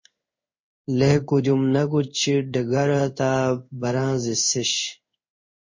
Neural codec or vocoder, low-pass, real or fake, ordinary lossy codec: codec, 16 kHz in and 24 kHz out, 1 kbps, XY-Tokenizer; 7.2 kHz; fake; MP3, 32 kbps